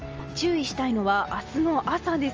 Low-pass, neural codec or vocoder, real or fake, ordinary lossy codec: 7.2 kHz; none; real; Opus, 24 kbps